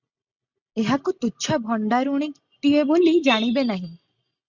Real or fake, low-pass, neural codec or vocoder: real; 7.2 kHz; none